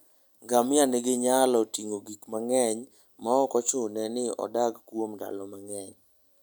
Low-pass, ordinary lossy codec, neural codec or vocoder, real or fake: none; none; none; real